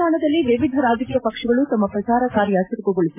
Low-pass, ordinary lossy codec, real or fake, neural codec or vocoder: 3.6 kHz; AAC, 24 kbps; real; none